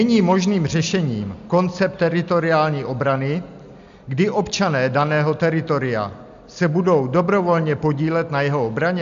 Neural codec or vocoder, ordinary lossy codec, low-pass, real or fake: none; MP3, 64 kbps; 7.2 kHz; real